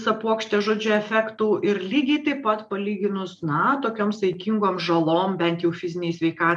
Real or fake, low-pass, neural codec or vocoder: real; 10.8 kHz; none